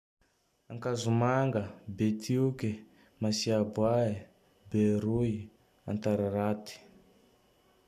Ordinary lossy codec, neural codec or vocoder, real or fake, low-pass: MP3, 96 kbps; none; real; 14.4 kHz